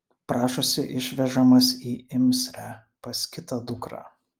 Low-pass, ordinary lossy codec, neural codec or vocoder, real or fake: 19.8 kHz; Opus, 32 kbps; none; real